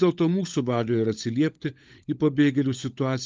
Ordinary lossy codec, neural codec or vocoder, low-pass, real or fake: Opus, 24 kbps; codec, 16 kHz, 16 kbps, FunCodec, trained on LibriTTS, 50 frames a second; 7.2 kHz; fake